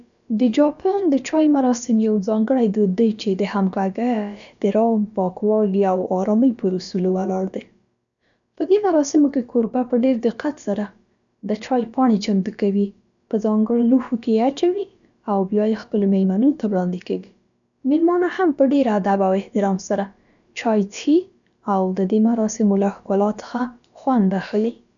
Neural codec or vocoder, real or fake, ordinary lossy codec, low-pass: codec, 16 kHz, about 1 kbps, DyCAST, with the encoder's durations; fake; none; 7.2 kHz